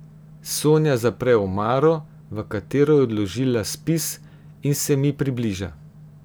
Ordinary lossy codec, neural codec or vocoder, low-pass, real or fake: none; none; none; real